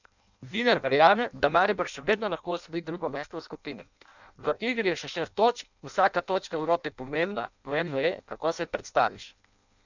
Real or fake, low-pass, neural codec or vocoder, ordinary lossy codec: fake; 7.2 kHz; codec, 16 kHz in and 24 kHz out, 0.6 kbps, FireRedTTS-2 codec; none